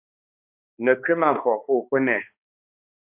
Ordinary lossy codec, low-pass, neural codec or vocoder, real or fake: AAC, 32 kbps; 3.6 kHz; codec, 16 kHz, 2 kbps, X-Codec, HuBERT features, trained on balanced general audio; fake